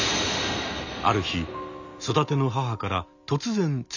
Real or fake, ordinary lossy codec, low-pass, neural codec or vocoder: real; none; 7.2 kHz; none